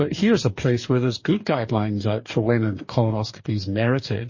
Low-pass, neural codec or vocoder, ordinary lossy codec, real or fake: 7.2 kHz; codec, 44.1 kHz, 2.6 kbps, DAC; MP3, 32 kbps; fake